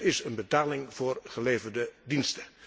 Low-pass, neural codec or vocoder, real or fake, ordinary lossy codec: none; none; real; none